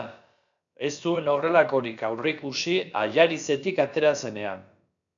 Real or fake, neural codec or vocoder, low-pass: fake; codec, 16 kHz, about 1 kbps, DyCAST, with the encoder's durations; 7.2 kHz